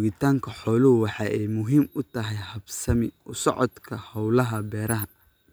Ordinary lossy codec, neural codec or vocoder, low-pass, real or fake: none; none; none; real